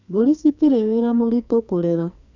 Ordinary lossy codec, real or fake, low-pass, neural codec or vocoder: none; fake; 7.2 kHz; codec, 44.1 kHz, 2.6 kbps, SNAC